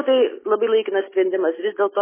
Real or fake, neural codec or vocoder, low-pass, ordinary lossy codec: real; none; 3.6 kHz; MP3, 16 kbps